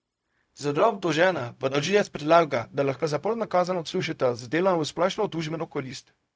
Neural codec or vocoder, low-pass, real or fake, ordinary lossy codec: codec, 16 kHz, 0.4 kbps, LongCat-Audio-Codec; none; fake; none